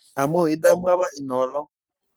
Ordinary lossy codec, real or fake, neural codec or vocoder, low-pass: none; fake; codec, 44.1 kHz, 3.4 kbps, Pupu-Codec; none